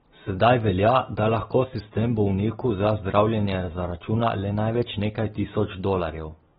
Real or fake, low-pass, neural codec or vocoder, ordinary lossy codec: real; 19.8 kHz; none; AAC, 16 kbps